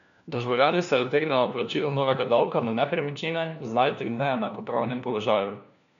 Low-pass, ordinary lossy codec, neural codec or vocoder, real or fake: 7.2 kHz; none; codec, 16 kHz, 1 kbps, FunCodec, trained on LibriTTS, 50 frames a second; fake